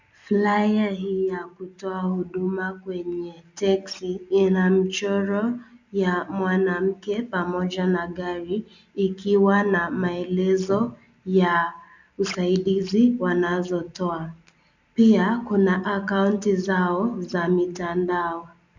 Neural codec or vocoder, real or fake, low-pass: none; real; 7.2 kHz